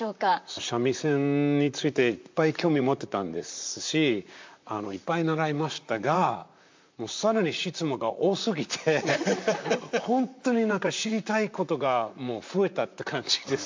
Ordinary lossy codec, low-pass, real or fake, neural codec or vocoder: MP3, 64 kbps; 7.2 kHz; fake; vocoder, 44.1 kHz, 128 mel bands, Pupu-Vocoder